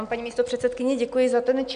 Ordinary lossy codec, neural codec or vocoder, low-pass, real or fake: MP3, 96 kbps; none; 9.9 kHz; real